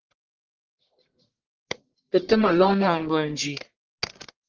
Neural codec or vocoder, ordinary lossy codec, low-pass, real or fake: codec, 44.1 kHz, 1.7 kbps, Pupu-Codec; Opus, 16 kbps; 7.2 kHz; fake